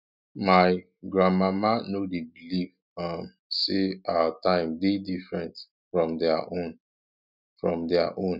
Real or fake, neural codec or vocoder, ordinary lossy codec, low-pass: real; none; none; 5.4 kHz